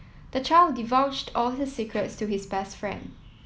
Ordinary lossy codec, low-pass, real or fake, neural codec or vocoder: none; none; real; none